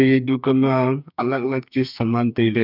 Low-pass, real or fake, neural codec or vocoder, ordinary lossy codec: 5.4 kHz; fake; codec, 44.1 kHz, 2.6 kbps, SNAC; none